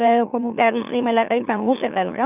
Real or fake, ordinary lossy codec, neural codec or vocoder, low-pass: fake; none; autoencoder, 44.1 kHz, a latent of 192 numbers a frame, MeloTTS; 3.6 kHz